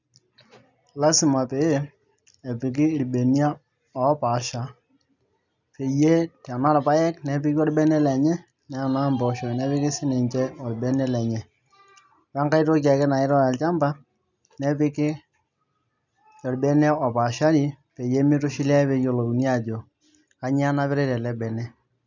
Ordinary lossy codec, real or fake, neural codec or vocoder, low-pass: none; real; none; 7.2 kHz